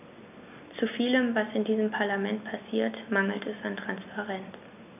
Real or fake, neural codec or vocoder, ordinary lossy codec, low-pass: real; none; none; 3.6 kHz